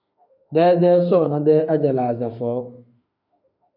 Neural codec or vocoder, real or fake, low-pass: autoencoder, 48 kHz, 32 numbers a frame, DAC-VAE, trained on Japanese speech; fake; 5.4 kHz